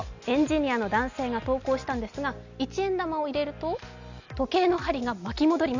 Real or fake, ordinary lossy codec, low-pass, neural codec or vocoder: real; none; 7.2 kHz; none